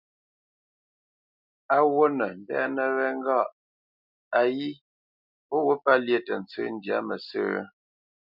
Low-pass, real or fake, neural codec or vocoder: 5.4 kHz; real; none